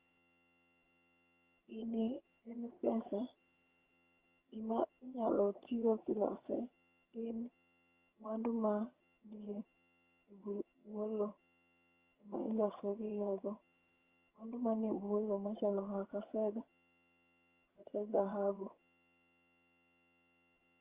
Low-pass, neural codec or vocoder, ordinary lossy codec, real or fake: 3.6 kHz; vocoder, 22.05 kHz, 80 mel bands, HiFi-GAN; Opus, 32 kbps; fake